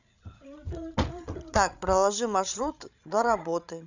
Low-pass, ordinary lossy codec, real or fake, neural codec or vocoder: 7.2 kHz; none; fake; codec, 16 kHz, 16 kbps, FreqCodec, larger model